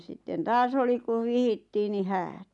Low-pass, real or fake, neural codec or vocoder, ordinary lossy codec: 10.8 kHz; real; none; MP3, 96 kbps